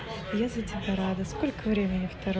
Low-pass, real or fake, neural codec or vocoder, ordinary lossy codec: none; real; none; none